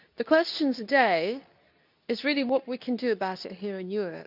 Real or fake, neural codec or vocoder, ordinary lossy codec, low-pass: fake; codec, 24 kHz, 0.9 kbps, WavTokenizer, medium speech release version 2; none; 5.4 kHz